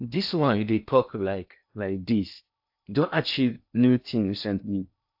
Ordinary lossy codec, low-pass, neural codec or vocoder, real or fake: AAC, 48 kbps; 5.4 kHz; codec, 16 kHz in and 24 kHz out, 0.6 kbps, FocalCodec, streaming, 2048 codes; fake